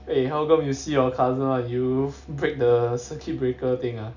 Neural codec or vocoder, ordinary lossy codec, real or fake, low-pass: none; none; real; 7.2 kHz